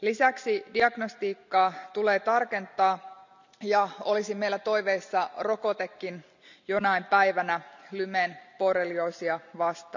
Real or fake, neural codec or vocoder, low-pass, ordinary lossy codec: real; none; 7.2 kHz; none